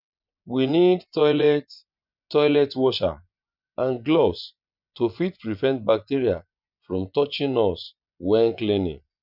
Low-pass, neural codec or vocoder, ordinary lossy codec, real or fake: 5.4 kHz; vocoder, 24 kHz, 100 mel bands, Vocos; none; fake